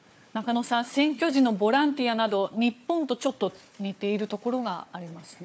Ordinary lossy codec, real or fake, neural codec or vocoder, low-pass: none; fake; codec, 16 kHz, 4 kbps, FunCodec, trained on Chinese and English, 50 frames a second; none